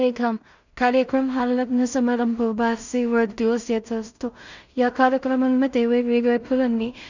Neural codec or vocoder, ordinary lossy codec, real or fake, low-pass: codec, 16 kHz in and 24 kHz out, 0.4 kbps, LongCat-Audio-Codec, two codebook decoder; none; fake; 7.2 kHz